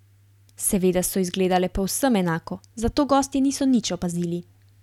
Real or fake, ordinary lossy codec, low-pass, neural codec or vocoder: real; none; 19.8 kHz; none